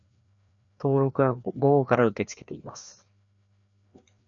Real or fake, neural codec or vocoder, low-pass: fake; codec, 16 kHz, 2 kbps, FreqCodec, larger model; 7.2 kHz